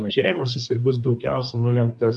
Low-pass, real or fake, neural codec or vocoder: 10.8 kHz; fake; codec, 24 kHz, 1 kbps, SNAC